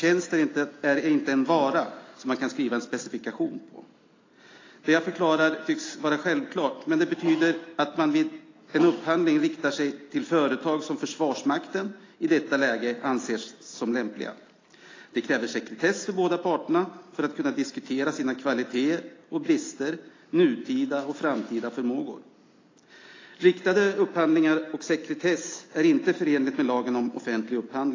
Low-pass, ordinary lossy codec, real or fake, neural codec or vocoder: 7.2 kHz; AAC, 32 kbps; real; none